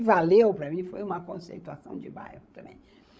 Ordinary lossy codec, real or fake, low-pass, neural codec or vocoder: none; fake; none; codec, 16 kHz, 16 kbps, FreqCodec, larger model